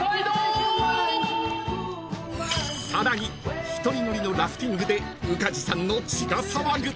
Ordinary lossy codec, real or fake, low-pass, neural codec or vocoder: none; real; none; none